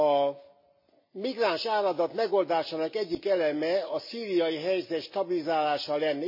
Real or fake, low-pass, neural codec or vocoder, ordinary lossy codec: real; 5.4 kHz; none; MP3, 24 kbps